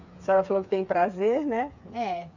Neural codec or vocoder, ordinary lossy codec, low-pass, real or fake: codec, 16 kHz, 8 kbps, FreqCodec, smaller model; none; 7.2 kHz; fake